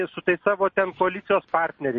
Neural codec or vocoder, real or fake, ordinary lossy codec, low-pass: none; real; MP3, 32 kbps; 7.2 kHz